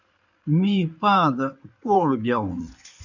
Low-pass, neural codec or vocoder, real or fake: 7.2 kHz; codec, 16 kHz in and 24 kHz out, 2.2 kbps, FireRedTTS-2 codec; fake